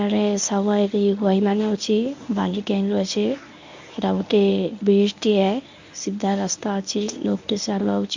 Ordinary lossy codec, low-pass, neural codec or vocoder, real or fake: MP3, 64 kbps; 7.2 kHz; codec, 24 kHz, 0.9 kbps, WavTokenizer, medium speech release version 1; fake